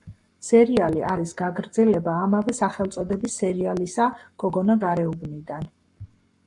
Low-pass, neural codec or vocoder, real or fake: 10.8 kHz; codec, 44.1 kHz, 7.8 kbps, Pupu-Codec; fake